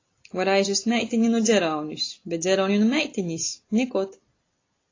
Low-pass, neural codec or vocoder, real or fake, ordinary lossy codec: 7.2 kHz; none; real; AAC, 32 kbps